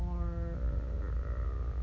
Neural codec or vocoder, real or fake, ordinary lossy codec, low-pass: none; real; none; 7.2 kHz